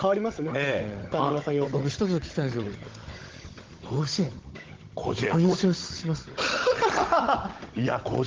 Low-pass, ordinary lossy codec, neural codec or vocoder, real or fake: 7.2 kHz; Opus, 16 kbps; codec, 16 kHz, 16 kbps, FunCodec, trained on Chinese and English, 50 frames a second; fake